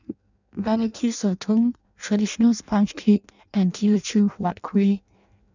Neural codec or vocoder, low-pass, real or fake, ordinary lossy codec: codec, 16 kHz in and 24 kHz out, 0.6 kbps, FireRedTTS-2 codec; 7.2 kHz; fake; none